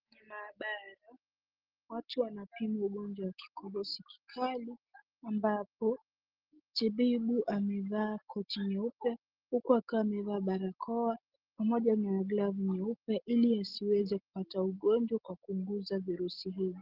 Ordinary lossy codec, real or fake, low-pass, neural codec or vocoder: Opus, 16 kbps; real; 5.4 kHz; none